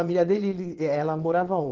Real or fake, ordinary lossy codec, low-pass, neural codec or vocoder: fake; Opus, 16 kbps; 7.2 kHz; vocoder, 22.05 kHz, 80 mel bands, WaveNeXt